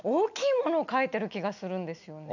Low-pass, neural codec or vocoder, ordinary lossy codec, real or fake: 7.2 kHz; none; none; real